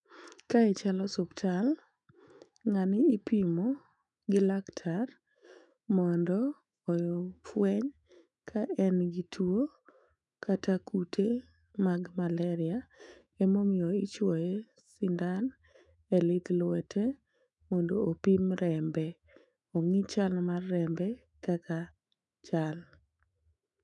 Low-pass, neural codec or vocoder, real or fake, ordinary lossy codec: 10.8 kHz; autoencoder, 48 kHz, 128 numbers a frame, DAC-VAE, trained on Japanese speech; fake; none